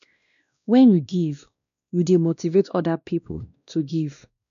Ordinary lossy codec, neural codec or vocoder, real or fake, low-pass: none; codec, 16 kHz, 1 kbps, X-Codec, WavLM features, trained on Multilingual LibriSpeech; fake; 7.2 kHz